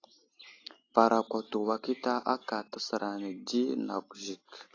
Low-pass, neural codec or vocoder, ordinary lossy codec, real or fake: 7.2 kHz; none; AAC, 32 kbps; real